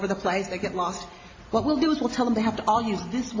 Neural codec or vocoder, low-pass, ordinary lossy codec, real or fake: none; 7.2 kHz; AAC, 32 kbps; real